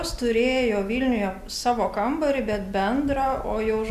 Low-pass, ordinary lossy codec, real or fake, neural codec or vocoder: 14.4 kHz; MP3, 96 kbps; real; none